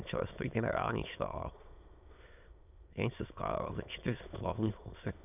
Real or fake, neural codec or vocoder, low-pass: fake; autoencoder, 22.05 kHz, a latent of 192 numbers a frame, VITS, trained on many speakers; 3.6 kHz